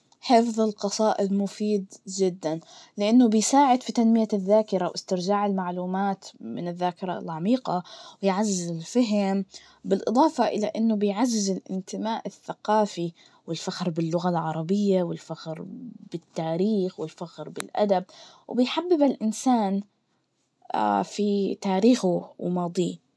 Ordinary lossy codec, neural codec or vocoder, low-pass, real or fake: none; none; none; real